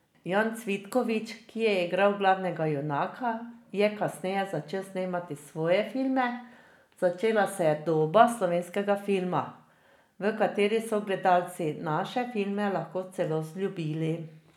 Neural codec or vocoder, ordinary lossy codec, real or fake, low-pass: none; none; real; 19.8 kHz